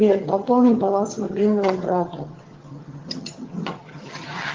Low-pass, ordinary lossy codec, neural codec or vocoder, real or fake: 7.2 kHz; Opus, 16 kbps; vocoder, 22.05 kHz, 80 mel bands, HiFi-GAN; fake